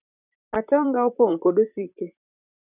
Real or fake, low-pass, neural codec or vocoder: fake; 3.6 kHz; vocoder, 44.1 kHz, 128 mel bands, Pupu-Vocoder